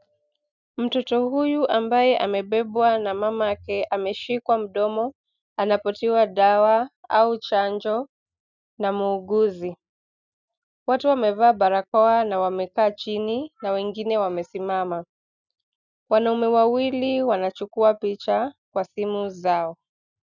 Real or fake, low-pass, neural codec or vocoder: real; 7.2 kHz; none